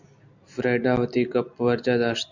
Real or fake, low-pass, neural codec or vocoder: real; 7.2 kHz; none